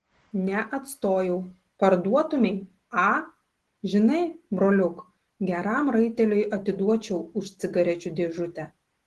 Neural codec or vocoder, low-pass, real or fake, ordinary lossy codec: none; 10.8 kHz; real; Opus, 16 kbps